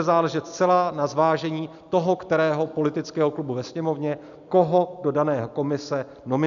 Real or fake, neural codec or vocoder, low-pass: real; none; 7.2 kHz